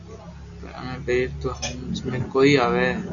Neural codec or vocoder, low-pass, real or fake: none; 7.2 kHz; real